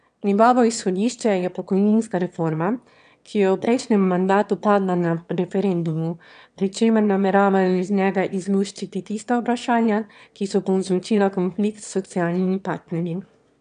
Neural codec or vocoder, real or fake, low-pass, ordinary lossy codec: autoencoder, 22.05 kHz, a latent of 192 numbers a frame, VITS, trained on one speaker; fake; 9.9 kHz; none